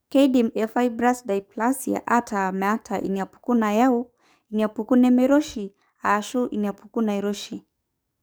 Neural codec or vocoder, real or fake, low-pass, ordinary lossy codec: codec, 44.1 kHz, 7.8 kbps, DAC; fake; none; none